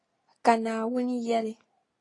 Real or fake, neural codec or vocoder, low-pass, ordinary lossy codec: real; none; 10.8 kHz; AAC, 32 kbps